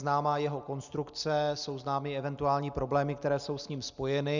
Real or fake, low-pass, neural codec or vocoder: real; 7.2 kHz; none